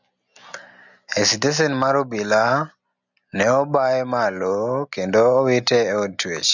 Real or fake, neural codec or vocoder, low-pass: real; none; 7.2 kHz